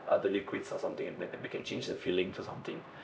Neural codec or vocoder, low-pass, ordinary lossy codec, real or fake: codec, 16 kHz, 1 kbps, X-Codec, HuBERT features, trained on LibriSpeech; none; none; fake